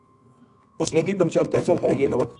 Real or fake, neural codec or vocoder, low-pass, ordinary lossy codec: fake; codec, 24 kHz, 0.9 kbps, WavTokenizer, medium music audio release; 10.8 kHz; AAC, 64 kbps